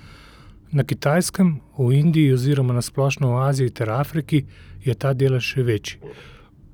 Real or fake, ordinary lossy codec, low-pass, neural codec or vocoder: real; none; 19.8 kHz; none